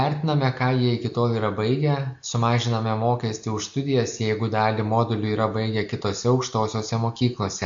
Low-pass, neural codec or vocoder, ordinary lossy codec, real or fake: 7.2 kHz; none; AAC, 64 kbps; real